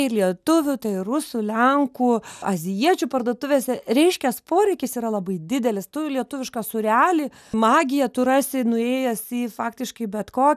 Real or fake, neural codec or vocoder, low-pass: real; none; 14.4 kHz